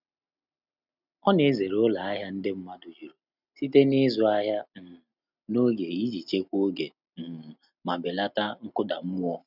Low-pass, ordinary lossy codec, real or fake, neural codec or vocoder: 5.4 kHz; none; real; none